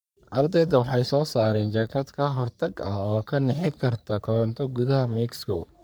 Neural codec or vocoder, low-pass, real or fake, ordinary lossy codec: codec, 44.1 kHz, 3.4 kbps, Pupu-Codec; none; fake; none